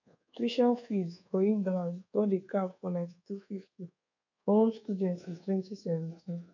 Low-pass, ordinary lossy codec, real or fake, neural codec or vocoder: 7.2 kHz; none; fake; codec, 24 kHz, 1.2 kbps, DualCodec